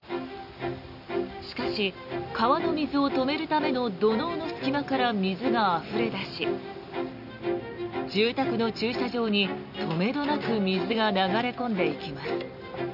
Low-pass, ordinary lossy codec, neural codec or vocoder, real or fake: 5.4 kHz; none; none; real